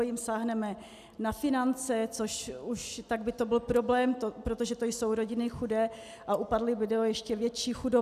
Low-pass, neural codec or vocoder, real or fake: 14.4 kHz; none; real